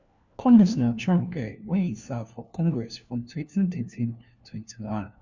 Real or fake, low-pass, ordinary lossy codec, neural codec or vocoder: fake; 7.2 kHz; none; codec, 16 kHz, 1 kbps, FunCodec, trained on LibriTTS, 50 frames a second